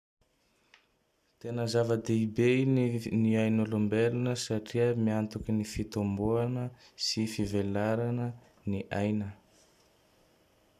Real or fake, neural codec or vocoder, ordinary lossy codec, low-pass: real; none; none; 14.4 kHz